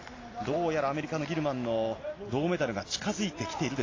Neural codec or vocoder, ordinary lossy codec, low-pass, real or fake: none; AAC, 32 kbps; 7.2 kHz; real